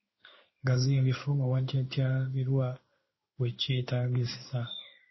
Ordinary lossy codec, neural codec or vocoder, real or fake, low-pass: MP3, 24 kbps; codec, 16 kHz in and 24 kHz out, 1 kbps, XY-Tokenizer; fake; 7.2 kHz